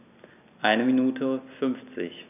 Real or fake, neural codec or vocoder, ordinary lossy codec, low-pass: real; none; none; 3.6 kHz